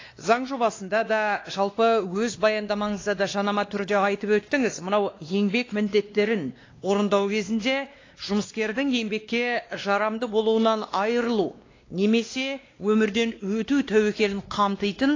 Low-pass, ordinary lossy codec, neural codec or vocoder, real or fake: 7.2 kHz; AAC, 32 kbps; codec, 16 kHz, 2 kbps, X-Codec, WavLM features, trained on Multilingual LibriSpeech; fake